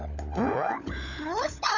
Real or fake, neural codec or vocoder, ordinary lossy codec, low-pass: fake; codec, 16 kHz, 16 kbps, FunCodec, trained on LibriTTS, 50 frames a second; none; 7.2 kHz